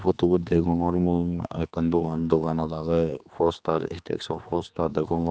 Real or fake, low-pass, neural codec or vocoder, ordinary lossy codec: fake; none; codec, 16 kHz, 2 kbps, X-Codec, HuBERT features, trained on general audio; none